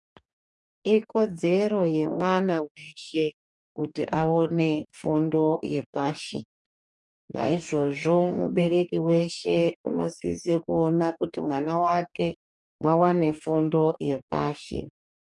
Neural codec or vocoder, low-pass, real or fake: codec, 44.1 kHz, 2.6 kbps, DAC; 10.8 kHz; fake